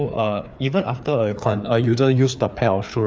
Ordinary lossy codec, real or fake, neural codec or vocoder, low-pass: none; fake; codec, 16 kHz, 4 kbps, FreqCodec, larger model; none